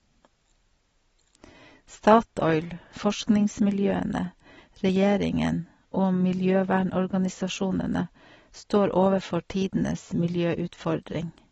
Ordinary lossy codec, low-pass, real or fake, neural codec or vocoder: AAC, 24 kbps; 19.8 kHz; real; none